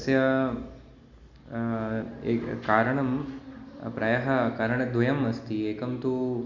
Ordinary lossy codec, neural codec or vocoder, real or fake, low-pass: none; none; real; 7.2 kHz